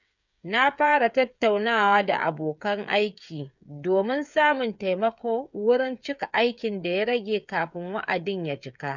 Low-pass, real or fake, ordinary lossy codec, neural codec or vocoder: 7.2 kHz; fake; none; codec, 16 kHz, 8 kbps, FreqCodec, smaller model